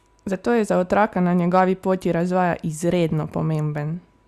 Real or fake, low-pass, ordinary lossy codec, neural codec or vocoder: real; 14.4 kHz; Opus, 64 kbps; none